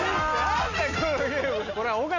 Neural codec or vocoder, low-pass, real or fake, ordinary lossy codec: none; 7.2 kHz; real; none